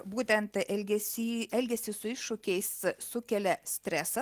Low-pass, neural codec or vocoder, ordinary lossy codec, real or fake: 14.4 kHz; none; Opus, 24 kbps; real